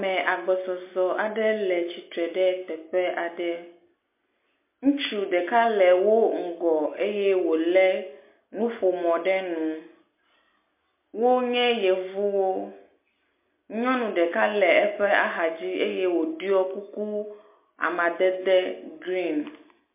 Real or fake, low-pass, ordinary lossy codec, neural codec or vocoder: real; 3.6 kHz; MP3, 24 kbps; none